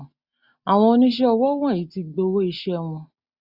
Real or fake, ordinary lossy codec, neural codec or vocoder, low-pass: real; Opus, 64 kbps; none; 5.4 kHz